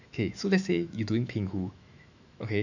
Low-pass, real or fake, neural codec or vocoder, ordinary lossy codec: 7.2 kHz; fake; autoencoder, 48 kHz, 128 numbers a frame, DAC-VAE, trained on Japanese speech; none